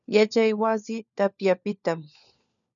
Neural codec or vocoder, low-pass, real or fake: codec, 16 kHz, 4 kbps, FunCodec, trained on LibriTTS, 50 frames a second; 7.2 kHz; fake